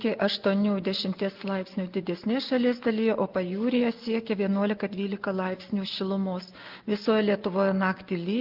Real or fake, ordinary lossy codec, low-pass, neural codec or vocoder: real; Opus, 32 kbps; 5.4 kHz; none